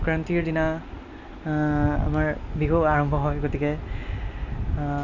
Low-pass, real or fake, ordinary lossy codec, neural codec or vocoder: 7.2 kHz; real; none; none